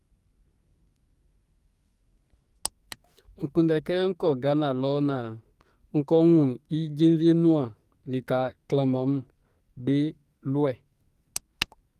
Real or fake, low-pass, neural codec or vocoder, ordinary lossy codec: fake; 14.4 kHz; codec, 44.1 kHz, 2.6 kbps, SNAC; Opus, 32 kbps